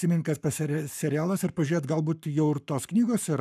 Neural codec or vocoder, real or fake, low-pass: codec, 44.1 kHz, 7.8 kbps, Pupu-Codec; fake; 14.4 kHz